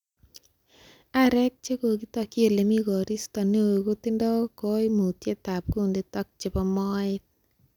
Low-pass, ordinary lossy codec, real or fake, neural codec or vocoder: 19.8 kHz; none; real; none